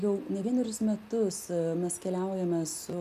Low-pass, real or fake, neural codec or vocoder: 14.4 kHz; real; none